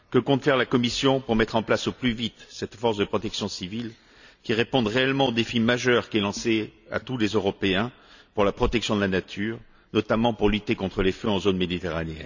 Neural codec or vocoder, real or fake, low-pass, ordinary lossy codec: none; real; 7.2 kHz; none